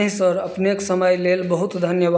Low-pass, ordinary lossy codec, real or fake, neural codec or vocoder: none; none; real; none